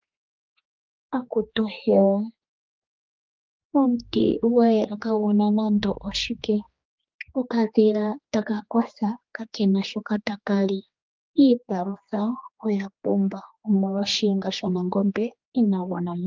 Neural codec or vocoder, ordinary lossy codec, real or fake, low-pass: codec, 16 kHz, 2 kbps, X-Codec, HuBERT features, trained on general audio; Opus, 24 kbps; fake; 7.2 kHz